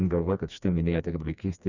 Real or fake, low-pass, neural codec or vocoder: fake; 7.2 kHz; codec, 16 kHz, 2 kbps, FreqCodec, smaller model